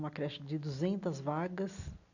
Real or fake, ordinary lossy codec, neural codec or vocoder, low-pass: real; none; none; 7.2 kHz